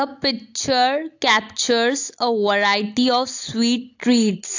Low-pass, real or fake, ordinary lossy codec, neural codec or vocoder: 7.2 kHz; real; AAC, 48 kbps; none